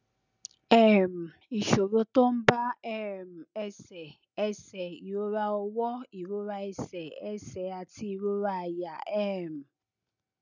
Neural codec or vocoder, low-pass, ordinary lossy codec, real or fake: none; 7.2 kHz; none; real